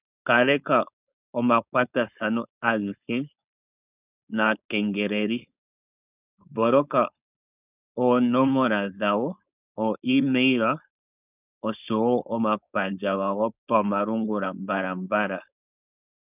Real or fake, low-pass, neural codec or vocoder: fake; 3.6 kHz; codec, 16 kHz, 4.8 kbps, FACodec